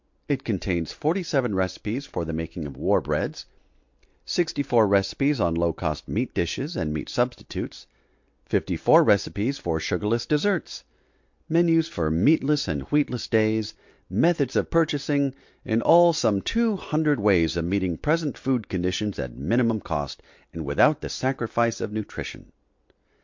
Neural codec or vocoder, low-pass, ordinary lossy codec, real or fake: none; 7.2 kHz; MP3, 48 kbps; real